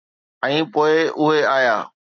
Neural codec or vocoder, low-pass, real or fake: none; 7.2 kHz; real